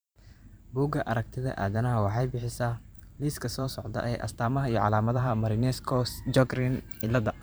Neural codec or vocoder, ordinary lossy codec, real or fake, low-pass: vocoder, 44.1 kHz, 128 mel bands every 512 samples, BigVGAN v2; none; fake; none